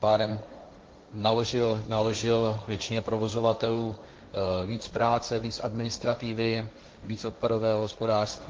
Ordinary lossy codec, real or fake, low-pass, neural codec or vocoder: Opus, 32 kbps; fake; 7.2 kHz; codec, 16 kHz, 1.1 kbps, Voila-Tokenizer